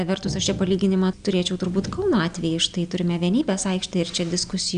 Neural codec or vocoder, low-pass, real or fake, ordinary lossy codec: none; 9.9 kHz; real; AAC, 96 kbps